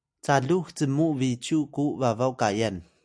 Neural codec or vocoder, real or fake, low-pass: none; real; 9.9 kHz